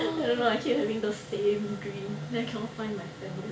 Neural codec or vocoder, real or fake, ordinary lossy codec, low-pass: none; real; none; none